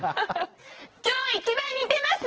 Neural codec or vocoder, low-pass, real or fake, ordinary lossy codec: vocoder, 22.05 kHz, 80 mel bands, WaveNeXt; 7.2 kHz; fake; Opus, 16 kbps